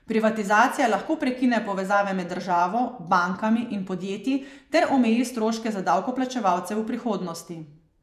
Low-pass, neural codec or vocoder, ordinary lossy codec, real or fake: 14.4 kHz; vocoder, 48 kHz, 128 mel bands, Vocos; none; fake